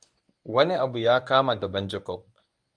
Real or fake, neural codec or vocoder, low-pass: fake; codec, 24 kHz, 0.9 kbps, WavTokenizer, medium speech release version 1; 9.9 kHz